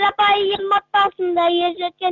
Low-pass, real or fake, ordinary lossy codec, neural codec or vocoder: 7.2 kHz; real; Opus, 64 kbps; none